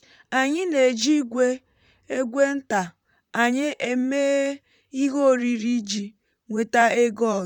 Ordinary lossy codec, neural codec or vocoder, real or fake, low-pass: none; vocoder, 44.1 kHz, 128 mel bands, Pupu-Vocoder; fake; 19.8 kHz